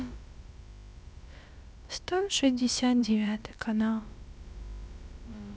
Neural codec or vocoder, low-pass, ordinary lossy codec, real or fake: codec, 16 kHz, about 1 kbps, DyCAST, with the encoder's durations; none; none; fake